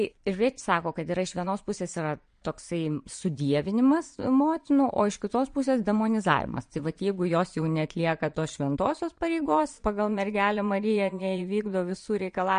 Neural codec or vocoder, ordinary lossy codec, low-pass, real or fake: vocoder, 22.05 kHz, 80 mel bands, Vocos; MP3, 48 kbps; 9.9 kHz; fake